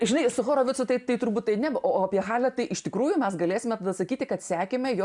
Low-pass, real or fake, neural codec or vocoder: 10.8 kHz; real; none